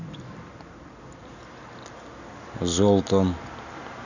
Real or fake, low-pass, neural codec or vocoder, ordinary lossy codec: real; 7.2 kHz; none; none